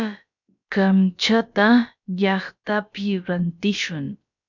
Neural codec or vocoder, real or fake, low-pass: codec, 16 kHz, about 1 kbps, DyCAST, with the encoder's durations; fake; 7.2 kHz